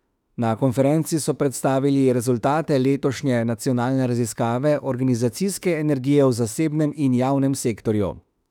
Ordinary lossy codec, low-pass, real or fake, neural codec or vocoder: none; 19.8 kHz; fake; autoencoder, 48 kHz, 32 numbers a frame, DAC-VAE, trained on Japanese speech